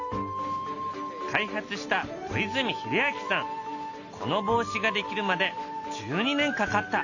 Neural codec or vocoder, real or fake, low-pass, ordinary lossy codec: none; real; 7.2 kHz; none